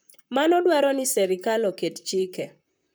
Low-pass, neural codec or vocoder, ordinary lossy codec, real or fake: none; vocoder, 44.1 kHz, 128 mel bands, Pupu-Vocoder; none; fake